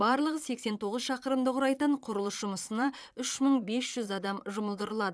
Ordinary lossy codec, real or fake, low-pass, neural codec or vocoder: none; fake; none; vocoder, 22.05 kHz, 80 mel bands, Vocos